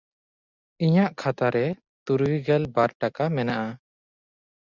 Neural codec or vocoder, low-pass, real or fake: none; 7.2 kHz; real